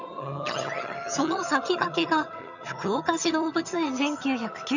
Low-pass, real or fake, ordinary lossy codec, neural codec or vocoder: 7.2 kHz; fake; none; vocoder, 22.05 kHz, 80 mel bands, HiFi-GAN